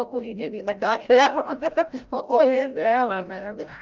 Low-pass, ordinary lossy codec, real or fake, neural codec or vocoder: 7.2 kHz; Opus, 24 kbps; fake; codec, 16 kHz, 0.5 kbps, FreqCodec, larger model